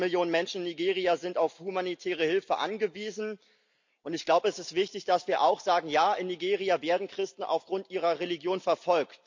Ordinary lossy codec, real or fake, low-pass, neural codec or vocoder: MP3, 64 kbps; real; 7.2 kHz; none